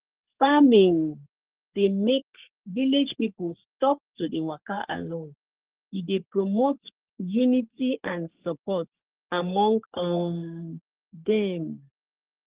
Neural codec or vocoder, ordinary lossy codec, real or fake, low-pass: codec, 44.1 kHz, 3.4 kbps, Pupu-Codec; Opus, 16 kbps; fake; 3.6 kHz